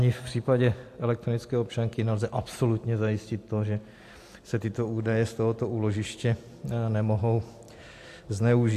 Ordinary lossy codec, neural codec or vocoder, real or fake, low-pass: AAC, 64 kbps; none; real; 14.4 kHz